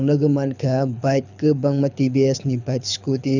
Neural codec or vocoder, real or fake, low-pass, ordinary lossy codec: codec, 24 kHz, 6 kbps, HILCodec; fake; 7.2 kHz; none